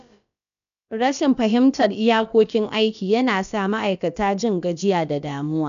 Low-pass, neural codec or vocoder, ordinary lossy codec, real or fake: 7.2 kHz; codec, 16 kHz, about 1 kbps, DyCAST, with the encoder's durations; none; fake